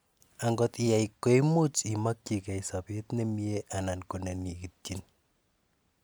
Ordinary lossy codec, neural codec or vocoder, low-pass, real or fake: none; none; none; real